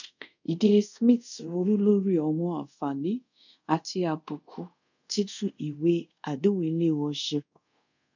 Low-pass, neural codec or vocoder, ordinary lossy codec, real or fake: 7.2 kHz; codec, 24 kHz, 0.5 kbps, DualCodec; none; fake